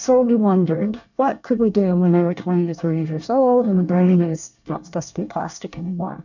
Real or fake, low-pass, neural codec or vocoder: fake; 7.2 kHz; codec, 24 kHz, 1 kbps, SNAC